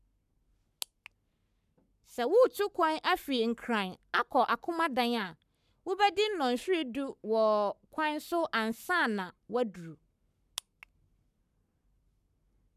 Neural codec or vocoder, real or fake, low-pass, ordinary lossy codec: codec, 44.1 kHz, 7.8 kbps, Pupu-Codec; fake; 14.4 kHz; none